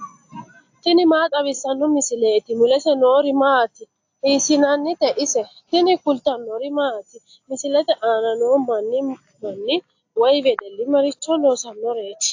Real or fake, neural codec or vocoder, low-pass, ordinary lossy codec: real; none; 7.2 kHz; AAC, 48 kbps